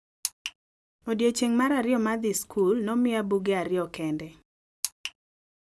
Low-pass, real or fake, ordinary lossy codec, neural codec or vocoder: none; real; none; none